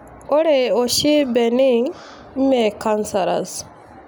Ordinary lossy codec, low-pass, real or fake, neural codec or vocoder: none; none; real; none